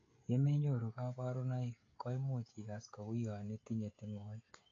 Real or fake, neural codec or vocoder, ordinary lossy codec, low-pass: fake; codec, 16 kHz, 16 kbps, FreqCodec, smaller model; AAC, 32 kbps; 7.2 kHz